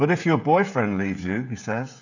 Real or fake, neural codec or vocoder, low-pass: fake; codec, 16 kHz, 16 kbps, FreqCodec, smaller model; 7.2 kHz